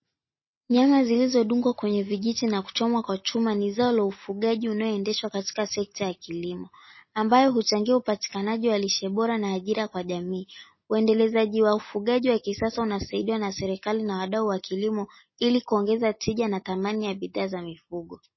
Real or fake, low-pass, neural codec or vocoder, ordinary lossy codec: real; 7.2 kHz; none; MP3, 24 kbps